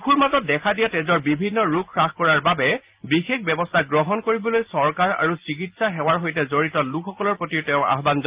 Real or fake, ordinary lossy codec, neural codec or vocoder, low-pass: real; Opus, 32 kbps; none; 3.6 kHz